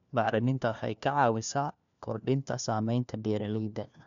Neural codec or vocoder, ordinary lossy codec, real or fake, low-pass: codec, 16 kHz, 1 kbps, FunCodec, trained on LibriTTS, 50 frames a second; none; fake; 7.2 kHz